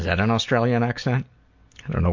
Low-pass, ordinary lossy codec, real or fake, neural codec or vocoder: 7.2 kHz; MP3, 48 kbps; real; none